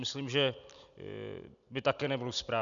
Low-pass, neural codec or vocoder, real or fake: 7.2 kHz; none; real